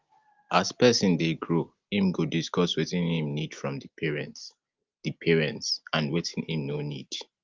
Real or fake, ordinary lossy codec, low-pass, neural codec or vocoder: real; Opus, 24 kbps; 7.2 kHz; none